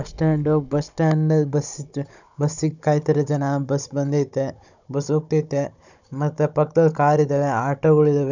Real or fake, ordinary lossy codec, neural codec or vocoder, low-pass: fake; none; codec, 16 kHz, 16 kbps, FunCodec, trained on Chinese and English, 50 frames a second; 7.2 kHz